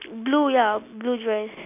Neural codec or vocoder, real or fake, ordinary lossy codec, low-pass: none; real; none; 3.6 kHz